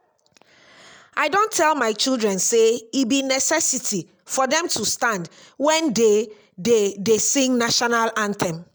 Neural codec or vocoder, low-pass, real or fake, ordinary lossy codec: none; none; real; none